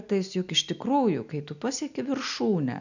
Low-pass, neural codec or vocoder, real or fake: 7.2 kHz; none; real